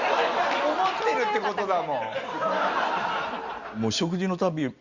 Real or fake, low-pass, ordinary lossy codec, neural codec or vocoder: real; 7.2 kHz; Opus, 64 kbps; none